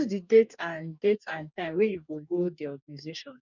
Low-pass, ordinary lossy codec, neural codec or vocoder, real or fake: 7.2 kHz; none; codec, 44.1 kHz, 2.6 kbps, DAC; fake